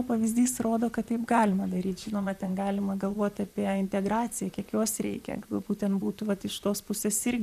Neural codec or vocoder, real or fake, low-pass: vocoder, 44.1 kHz, 128 mel bands, Pupu-Vocoder; fake; 14.4 kHz